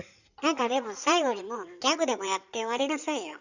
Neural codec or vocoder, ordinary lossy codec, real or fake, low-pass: codec, 16 kHz in and 24 kHz out, 2.2 kbps, FireRedTTS-2 codec; none; fake; 7.2 kHz